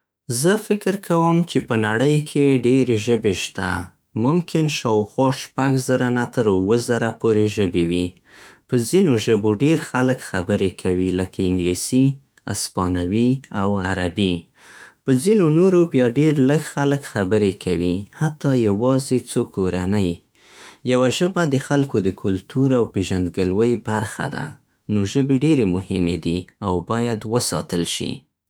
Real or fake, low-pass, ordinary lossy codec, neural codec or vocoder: fake; none; none; autoencoder, 48 kHz, 32 numbers a frame, DAC-VAE, trained on Japanese speech